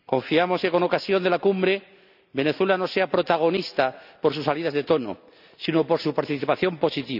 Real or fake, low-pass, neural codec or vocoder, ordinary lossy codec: real; 5.4 kHz; none; none